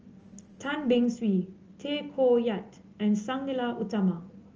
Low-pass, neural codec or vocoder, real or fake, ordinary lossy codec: 7.2 kHz; none; real; Opus, 24 kbps